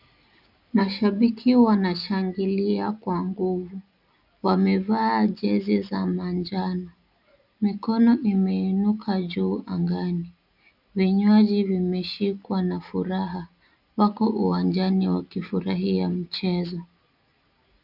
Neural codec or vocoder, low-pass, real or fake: none; 5.4 kHz; real